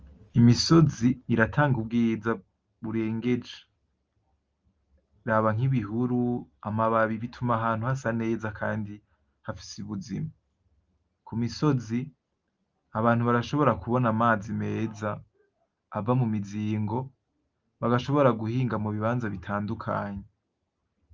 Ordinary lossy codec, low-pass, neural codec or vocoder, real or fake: Opus, 24 kbps; 7.2 kHz; none; real